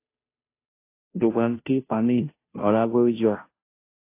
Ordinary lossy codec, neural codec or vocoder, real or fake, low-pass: AAC, 24 kbps; codec, 16 kHz, 0.5 kbps, FunCodec, trained on Chinese and English, 25 frames a second; fake; 3.6 kHz